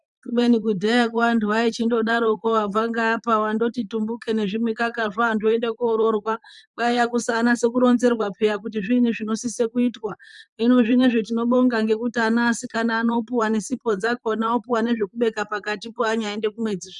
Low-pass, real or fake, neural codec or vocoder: 10.8 kHz; fake; vocoder, 44.1 kHz, 128 mel bands, Pupu-Vocoder